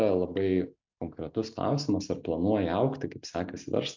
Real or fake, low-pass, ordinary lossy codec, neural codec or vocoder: real; 7.2 kHz; MP3, 64 kbps; none